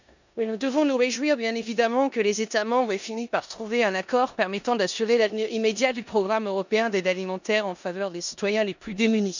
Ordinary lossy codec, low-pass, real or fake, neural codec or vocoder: none; 7.2 kHz; fake; codec, 16 kHz in and 24 kHz out, 0.9 kbps, LongCat-Audio-Codec, four codebook decoder